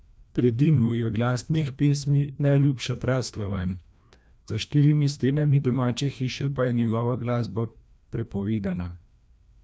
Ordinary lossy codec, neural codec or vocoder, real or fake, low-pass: none; codec, 16 kHz, 1 kbps, FreqCodec, larger model; fake; none